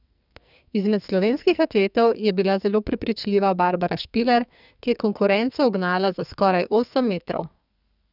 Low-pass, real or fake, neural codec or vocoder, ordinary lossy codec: 5.4 kHz; fake; codec, 44.1 kHz, 2.6 kbps, SNAC; none